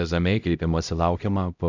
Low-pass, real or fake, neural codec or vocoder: 7.2 kHz; fake; codec, 16 kHz, 0.5 kbps, X-Codec, HuBERT features, trained on LibriSpeech